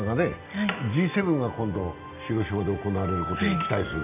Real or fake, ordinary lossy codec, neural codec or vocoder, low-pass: real; none; none; 3.6 kHz